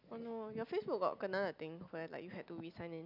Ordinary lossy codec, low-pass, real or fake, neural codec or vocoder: none; 5.4 kHz; real; none